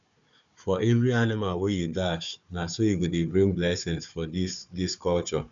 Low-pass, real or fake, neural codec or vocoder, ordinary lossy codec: 7.2 kHz; fake; codec, 16 kHz, 4 kbps, FunCodec, trained on Chinese and English, 50 frames a second; MP3, 96 kbps